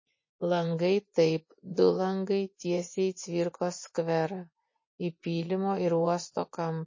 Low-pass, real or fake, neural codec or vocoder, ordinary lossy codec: 7.2 kHz; fake; vocoder, 24 kHz, 100 mel bands, Vocos; MP3, 32 kbps